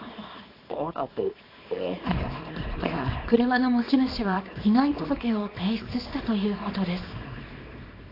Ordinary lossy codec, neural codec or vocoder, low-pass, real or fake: AAC, 32 kbps; codec, 24 kHz, 0.9 kbps, WavTokenizer, small release; 5.4 kHz; fake